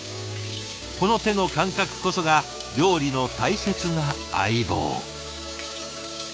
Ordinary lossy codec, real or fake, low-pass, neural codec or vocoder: none; fake; none; codec, 16 kHz, 6 kbps, DAC